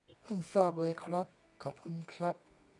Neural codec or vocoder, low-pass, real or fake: codec, 24 kHz, 0.9 kbps, WavTokenizer, medium music audio release; 10.8 kHz; fake